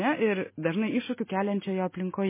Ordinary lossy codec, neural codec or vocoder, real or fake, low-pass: MP3, 16 kbps; none; real; 3.6 kHz